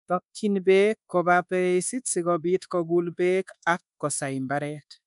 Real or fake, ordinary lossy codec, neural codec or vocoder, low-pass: fake; none; codec, 24 kHz, 1.2 kbps, DualCodec; 10.8 kHz